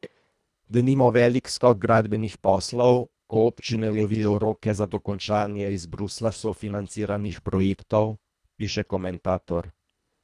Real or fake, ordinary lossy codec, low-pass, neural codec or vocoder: fake; none; none; codec, 24 kHz, 1.5 kbps, HILCodec